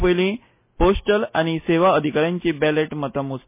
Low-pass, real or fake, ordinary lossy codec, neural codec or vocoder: 3.6 kHz; real; MP3, 24 kbps; none